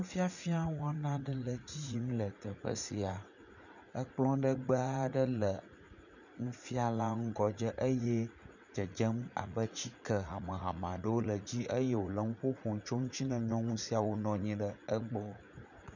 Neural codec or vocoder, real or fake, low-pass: vocoder, 44.1 kHz, 80 mel bands, Vocos; fake; 7.2 kHz